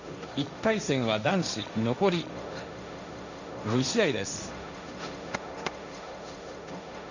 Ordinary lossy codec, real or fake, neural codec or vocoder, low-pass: none; fake; codec, 16 kHz, 1.1 kbps, Voila-Tokenizer; 7.2 kHz